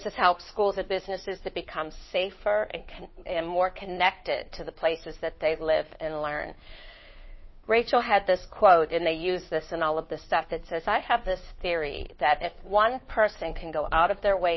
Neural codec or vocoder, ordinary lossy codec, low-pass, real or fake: codec, 16 kHz, 2 kbps, FunCodec, trained on Chinese and English, 25 frames a second; MP3, 24 kbps; 7.2 kHz; fake